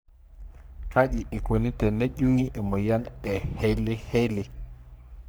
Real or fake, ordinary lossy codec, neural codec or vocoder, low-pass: fake; none; codec, 44.1 kHz, 3.4 kbps, Pupu-Codec; none